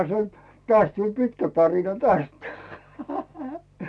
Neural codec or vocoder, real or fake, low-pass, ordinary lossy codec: none; real; none; none